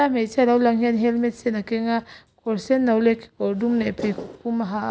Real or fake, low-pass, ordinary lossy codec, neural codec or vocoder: real; none; none; none